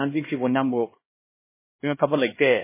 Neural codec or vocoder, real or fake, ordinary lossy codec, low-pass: codec, 16 kHz, 1 kbps, X-Codec, HuBERT features, trained on LibriSpeech; fake; MP3, 16 kbps; 3.6 kHz